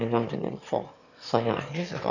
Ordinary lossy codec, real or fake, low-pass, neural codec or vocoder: none; fake; 7.2 kHz; autoencoder, 22.05 kHz, a latent of 192 numbers a frame, VITS, trained on one speaker